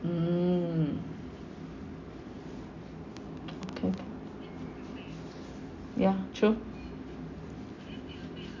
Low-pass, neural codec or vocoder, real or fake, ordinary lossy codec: 7.2 kHz; none; real; MP3, 64 kbps